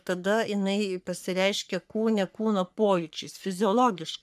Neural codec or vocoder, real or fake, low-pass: codec, 44.1 kHz, 3.4 kbps, Pupu-Codec; fake; 14.4 kHz